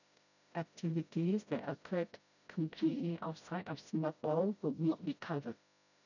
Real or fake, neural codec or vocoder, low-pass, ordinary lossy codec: fake; codec, 16 kHz, 0.5 kbps, FreqCodec, smaller model; 7.2 kHz; none